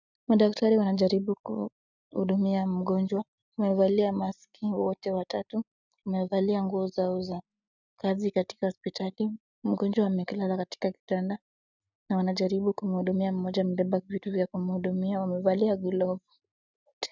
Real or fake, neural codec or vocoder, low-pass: real; none; 7.2 kHz